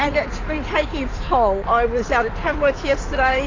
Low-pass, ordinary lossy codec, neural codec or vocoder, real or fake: 7.2 kHz; AAC, 32 kbps; codec, 16 kHz in and 24 kHz out, 2.2 kbps, FireRedTTS-2 codec; fake